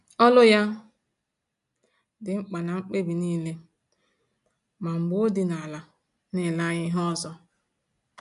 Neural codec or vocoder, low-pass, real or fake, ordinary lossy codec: none; 10.8 kHz; real; none